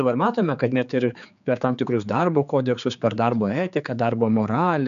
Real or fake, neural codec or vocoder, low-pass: fake; codec, 16 kHz, 4 kbps, X-Codec, HuBERT features, trained on general audio; 7.2 kHz